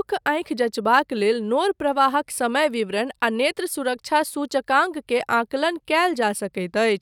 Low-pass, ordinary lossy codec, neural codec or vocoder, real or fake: 19.8 kHz; none; none; real